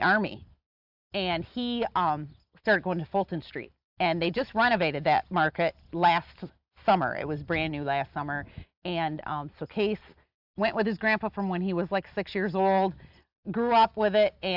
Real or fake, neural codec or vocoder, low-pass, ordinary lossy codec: real; none; 5.4 kHz; AAC, 48 kbps